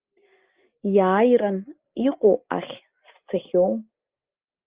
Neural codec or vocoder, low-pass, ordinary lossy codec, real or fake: none; 3.6 kHz; Opus, 32 kbps; real